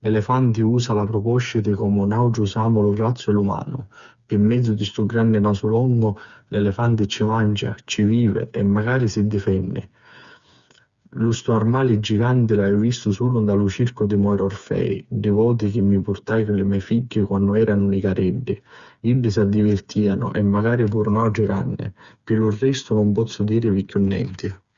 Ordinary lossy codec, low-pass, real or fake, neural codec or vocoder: Opus, 64 kbps; 7.2 kHz; fake; codec, 16 kHz, 4 kbps, FreqCodec, smaller model